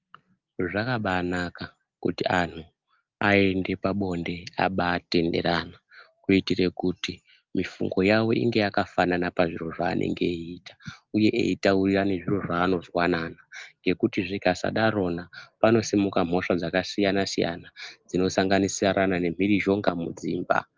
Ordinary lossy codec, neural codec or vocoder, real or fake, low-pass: Opus, 32 kbps; none; real; 7.2 kHz